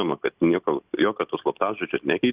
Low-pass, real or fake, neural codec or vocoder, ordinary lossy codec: 3.6 kHz; real; none; Opus, 64 kbps